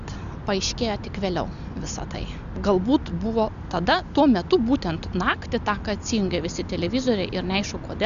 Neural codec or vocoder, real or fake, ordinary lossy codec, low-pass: none; real; AAC, 96 kbps; 7.2 kHz